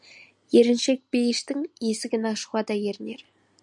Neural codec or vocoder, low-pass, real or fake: none; 10.8 kHz; real